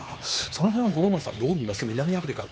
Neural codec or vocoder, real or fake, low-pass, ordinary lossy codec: codec, 16 kHz, 4 kbps, X-Codec, HuBERT features, trained on LibriSpeech; fake; none; none